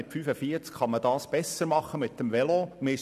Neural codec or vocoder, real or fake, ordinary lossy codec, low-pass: none; real; none; 14.4 kHz